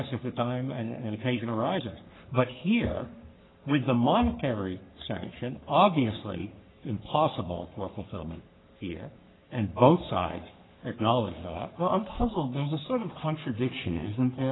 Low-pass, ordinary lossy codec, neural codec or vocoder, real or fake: 7.2 kHz; AAC, 16 kbps; codec, 44.1 kHz, 3.4 kbps, Pupu-Codec; fake